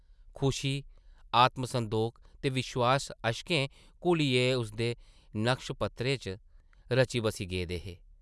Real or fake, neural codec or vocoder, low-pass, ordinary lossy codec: real; none; none; none